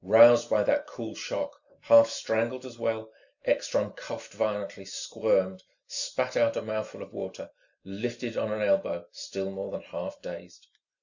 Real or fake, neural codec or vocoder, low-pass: real; none; 7.2 kHz